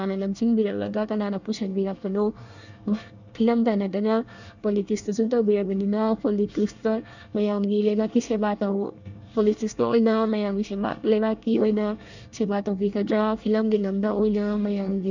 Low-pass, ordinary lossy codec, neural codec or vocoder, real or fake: 7.2 kHz; none; codec, 24 kHz, 1 kbps, SNAC; fake